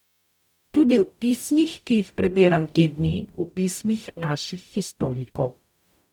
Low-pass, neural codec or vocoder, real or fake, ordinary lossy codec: 19.8 kHz; codec, 44.1 kHz, 0.9 kbps, DAC; fake; none